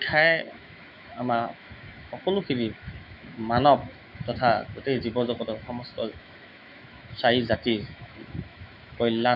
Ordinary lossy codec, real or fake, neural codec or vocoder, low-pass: none; fake; autoencoder, 48 kHz, 128 numbers a frame, DAC-VAE, trained on Japanese speech; 5.4 kHz